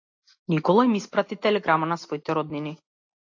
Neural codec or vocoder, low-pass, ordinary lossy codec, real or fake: none; 7.2 kHz; AAC, 48 kbps; real